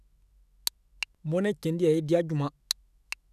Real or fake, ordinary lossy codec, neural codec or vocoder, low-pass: fake; none; autoencoder, 48 kHz, 128 numbers a frame, DAC-VAE, trained on Japanese speech; 14.4 kHz